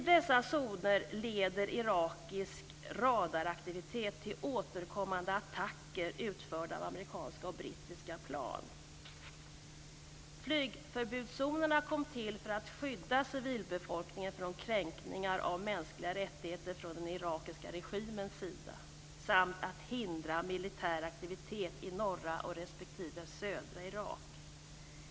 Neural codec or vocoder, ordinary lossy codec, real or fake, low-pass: none; none; real; none